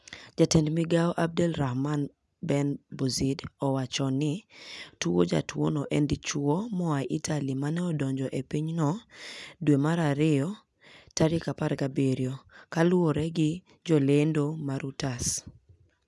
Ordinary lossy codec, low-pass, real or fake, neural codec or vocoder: none; none; real; none